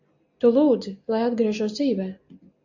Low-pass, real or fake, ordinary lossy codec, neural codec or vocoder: 7.2 kHz; real; AAC, 48 kbps; none